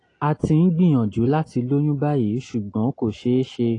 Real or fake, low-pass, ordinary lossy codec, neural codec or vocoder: real; 9.9 kHz; AAC, 32 kbps; none